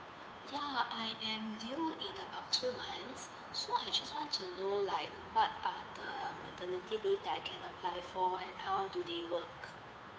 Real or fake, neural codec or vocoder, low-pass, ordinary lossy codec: fake; codec, 16 kHz, 2 kbps, FunCodec, trained on Chinese and English, 25 frames a second; none; none